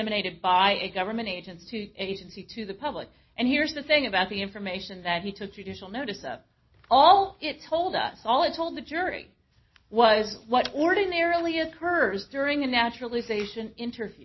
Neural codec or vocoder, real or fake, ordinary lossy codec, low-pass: none; real; MP3, 24 kbps; 7.2 kHz